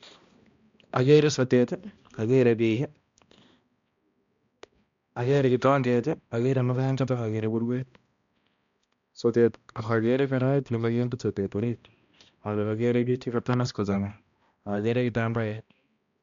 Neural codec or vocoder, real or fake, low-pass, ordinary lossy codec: codec, 16 kHz, 1 kbps, X-Codec, HuBERT features, trained on balanced general audio; fake; 7.2 kHz; MP3, 64 kbps